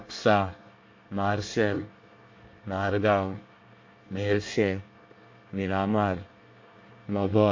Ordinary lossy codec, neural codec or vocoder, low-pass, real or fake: MP3, 48 kbps; codec, 24 kHz, 1 kbps, SNAC; 7.2 kHz; fake